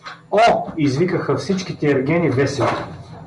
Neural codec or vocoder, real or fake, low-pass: none; real; 10.8 kHz